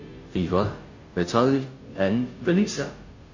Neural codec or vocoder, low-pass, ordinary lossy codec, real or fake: codec, 16 kHz, 0.5 kbps, FunCodec, trained on Chinese and English, 25 frames a second; 7.2 kHz; MP3, 32 kbps; fake